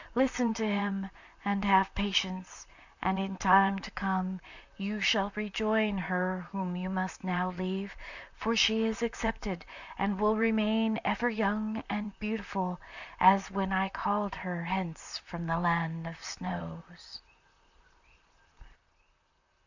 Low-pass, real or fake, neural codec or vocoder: 7.2 kHz; fake; vocoder, 44.1 kHz, 128 mel bands, Pupu-Vocoder